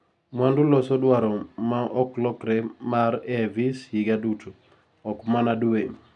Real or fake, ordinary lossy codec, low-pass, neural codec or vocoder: real; none; 10.8 kHz; none